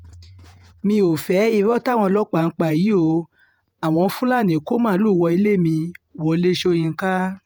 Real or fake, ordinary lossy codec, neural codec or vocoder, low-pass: fake; none; vocoder, 48 kHz, 128 mel bands, Vocos; none